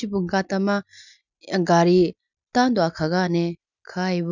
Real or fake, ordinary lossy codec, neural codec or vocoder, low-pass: real; none; none; 7.2 kHz